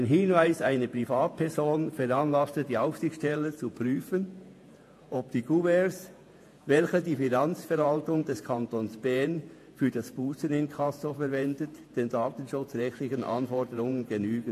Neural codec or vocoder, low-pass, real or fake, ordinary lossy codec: vocoder, 48 kHz, 128 mel bands, Vocos; 14.4 kHz; fake; AAC, 64 kbps